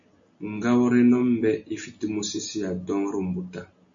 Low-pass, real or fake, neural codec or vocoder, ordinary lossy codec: 7.2 kHz; real; none; AAC, 64 kbps